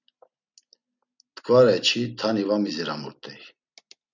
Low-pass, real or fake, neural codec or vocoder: 7.2 kHz; real; none